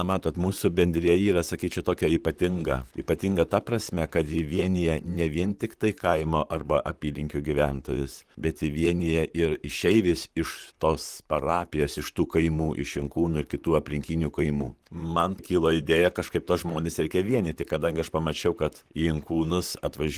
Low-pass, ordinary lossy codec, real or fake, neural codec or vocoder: 14.4 kHz; Opus, 24 kbps; fake; vocoder, 44.1 kHz, 128 mel bands, Pupu-Vocoder